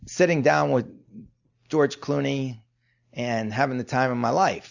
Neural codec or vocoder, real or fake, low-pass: none; real; 7.2 kHz